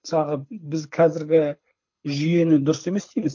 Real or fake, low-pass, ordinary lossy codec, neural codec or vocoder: fake; 7.2 kHz; MP3, 48 kbps; codec, 24 kHz, 6 kbps, HILCodec